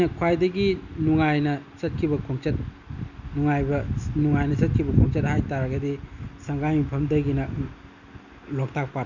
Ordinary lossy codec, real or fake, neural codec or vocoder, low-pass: none; real; none; 7.2 kHz